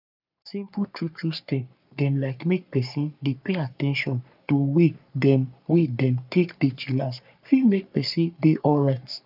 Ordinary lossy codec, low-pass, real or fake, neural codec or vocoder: none; 5.4 kHz; fake; codec, 44.1 kHz, 3.4 kbps, Pupu-Codec